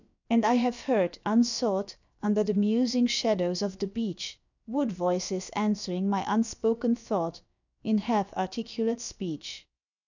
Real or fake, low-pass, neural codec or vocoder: fake; 7.2 kHz; codec, 16 kHz, about 1 kbps, DyCAST, with the encoder's durations